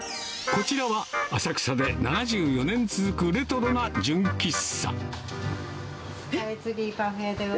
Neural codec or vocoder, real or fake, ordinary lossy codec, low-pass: none; real; none; none